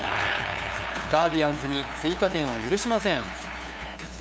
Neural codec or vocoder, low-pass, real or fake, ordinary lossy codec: codec, 16 kHz, 2 kbps, FunCodec, trained on LibriTTS, 25 frames a second; none; fake; none